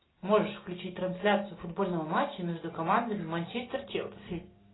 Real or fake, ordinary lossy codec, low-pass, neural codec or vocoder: real; AAC, 16 kbps; 7.2 kHz; none